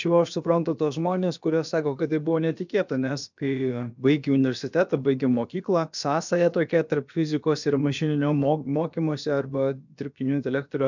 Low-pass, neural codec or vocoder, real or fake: 7.2 kHz; codec, 16 kHz, about 1 kbps, DyCAST, with the encoder's durations; fake